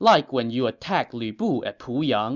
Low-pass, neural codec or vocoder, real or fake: 7.2 kHz; none; real